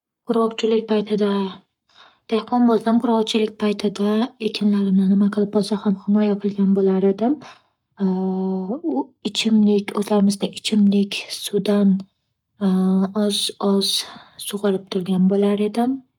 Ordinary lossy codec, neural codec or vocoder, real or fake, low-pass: none; codec, 44.1 kHz, 7.8 kbps, Pupu-Codec; fake; 19.8 kHz